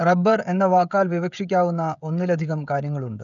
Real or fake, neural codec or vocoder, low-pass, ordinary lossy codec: fake; codec, 16 kHz, 16 kbps, FreqCodec, smaller model; 7.2 kHz; none